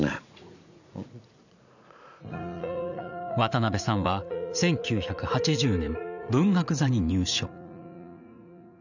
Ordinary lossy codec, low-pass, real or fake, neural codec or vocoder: none; 7.2 kHz; fake; vocoder, 44.1 kHz, 80 mel bands, Vocos